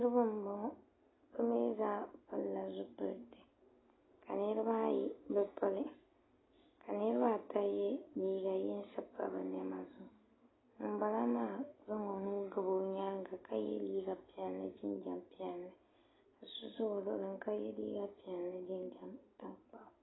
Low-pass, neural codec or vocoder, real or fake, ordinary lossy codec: 7.2 kHz; none; real; AAC, 16 kbps